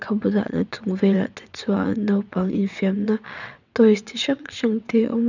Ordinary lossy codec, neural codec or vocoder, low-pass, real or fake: none; vocoder, 22.05 kHz, 80 mel bands, WaveNeXt; 7.2 kHz; fake